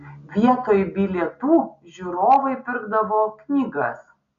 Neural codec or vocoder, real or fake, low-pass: none; real; 7.2 kHz